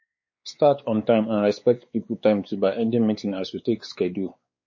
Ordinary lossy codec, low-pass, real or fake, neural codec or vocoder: MP3, 32 kbps; 7.2 kHz; fake; codec, 16 kHz, 4 kbps, X-Codec, WavLM features, trained on Multilingual LibriSpeech